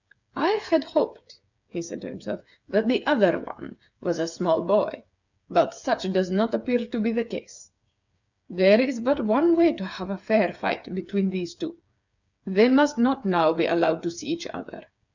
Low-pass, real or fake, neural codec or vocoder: 7.2 kHz; fake; codec, 16 kHz, 8 kbps, FreqCodec, smaller model